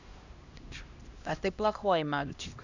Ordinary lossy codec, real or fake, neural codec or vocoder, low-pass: Opus, 64 kbps; fake; codec, 16 kHz, 1 kbps, X-Codec, HuBERT features, trained on LibriSpeech; 7.2 kHz